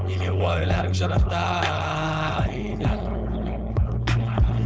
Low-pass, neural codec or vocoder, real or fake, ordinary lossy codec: none; codec, 16 kHz, 4.8 kbps, FACodec; fake; none